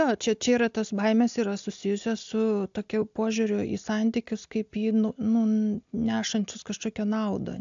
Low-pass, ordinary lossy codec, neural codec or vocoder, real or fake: 7.2 kHz; AAC, 64 kbps; none; real